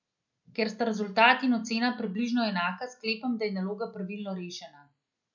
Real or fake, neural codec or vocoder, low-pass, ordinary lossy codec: real; none; 7.2 kHz; none